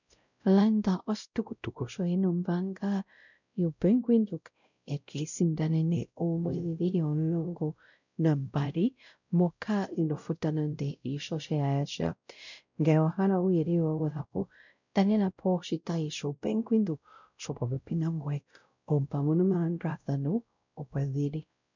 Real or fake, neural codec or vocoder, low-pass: fake; codec, 16 kHz, 0.5 kbps, X-Codec, WavLM features, trained on Multilingual LibriSpeech; 7.2 kHz